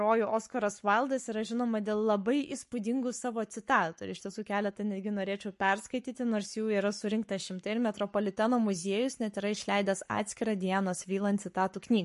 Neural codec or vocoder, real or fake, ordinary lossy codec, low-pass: codec, 44.1 kHz, 7.8 kbps, Pupu-Codec; fake; MP3, 48 kbps; 14.4 kHz